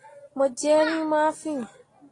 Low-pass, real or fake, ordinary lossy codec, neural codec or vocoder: 10.8 kHz; real; AAC, 32 kbps; none